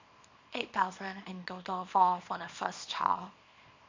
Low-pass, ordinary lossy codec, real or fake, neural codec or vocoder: 7.2 kHz; MP3, 64 kbps; fake; codec, 24 kHz, 0.9 kbps, WavTokenizer, small release